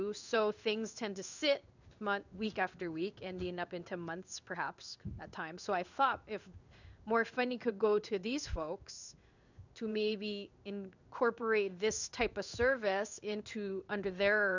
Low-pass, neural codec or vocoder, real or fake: 7.2 kHz; codec, 16 kHz in and 24 kHz out, 1 kbps, XY-Tokenizer; fake